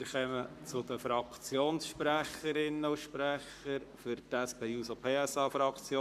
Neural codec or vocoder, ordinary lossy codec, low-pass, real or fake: codec, 44.1 kHz, 7.8 kbps, Pupu-Codec; none; 14.4 kHz; fake